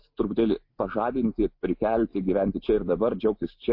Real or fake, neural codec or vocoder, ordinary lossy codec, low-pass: real; none; MP3, 32 kbps; 5.4 kHz